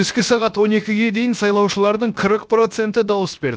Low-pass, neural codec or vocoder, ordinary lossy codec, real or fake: none; codec, 16 kHz, 0.7 kbps, FocalCodec; none; fake